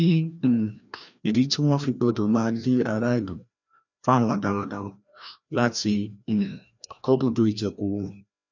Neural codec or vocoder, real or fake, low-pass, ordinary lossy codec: codec, 16 kHz, 1 kbps, FreqCodec, larger model; fake; 7.2 kHz; none